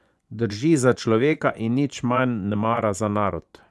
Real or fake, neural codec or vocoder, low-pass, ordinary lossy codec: fake; vocoder, 24 kHz, 100 mel bands, Vocos; none; none